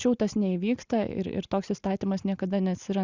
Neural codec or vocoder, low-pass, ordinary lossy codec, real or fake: none; 7.2 kHz; Opus, 64 kbps; real